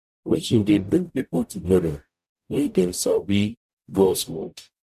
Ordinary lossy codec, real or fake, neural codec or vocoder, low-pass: none; fake; codec, 44.1 kHz, 0.9 kbps, DAC; 14.4 kHz